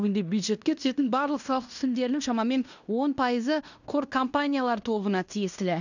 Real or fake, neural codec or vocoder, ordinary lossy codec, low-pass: fake; codec, 16 kHz in and 24 kHz out, 0.9 kbps, LongCat-Audio-Codec, fine tuned four codebook decoder; none; 7.2 kHz